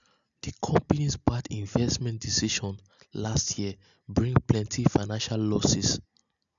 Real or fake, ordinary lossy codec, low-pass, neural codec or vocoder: real; none; 7.2 kHz; none